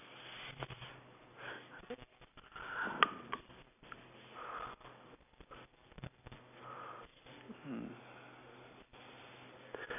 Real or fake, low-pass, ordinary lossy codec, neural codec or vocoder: real; 3.6 kHz; none; none